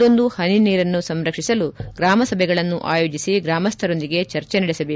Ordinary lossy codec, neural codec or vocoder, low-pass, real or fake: none; none; none; real